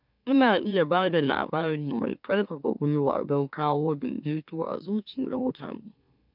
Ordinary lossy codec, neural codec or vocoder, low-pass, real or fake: none; autoencoder, 44.1 kHz, a latent of 192 numbers a frame, MeloTTS; 5.4 kHz; fake